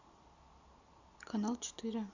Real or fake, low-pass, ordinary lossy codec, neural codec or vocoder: real; 7.2 kHz; Opus, 64 kbps; none